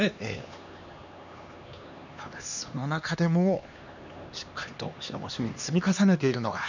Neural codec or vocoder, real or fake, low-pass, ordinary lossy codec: codec, 16 kHz, 2 kbps, X-Codec, HuBERT features, trained on LibriSpeech; fake; 7.2 kHz; none